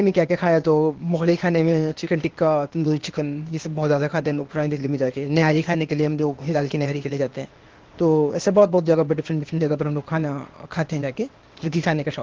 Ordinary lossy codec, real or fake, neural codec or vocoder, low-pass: Opus, 16 kbps; fake; codec, 16 kHz, 0.8 kbps, ZipCodec; 7.2 kHz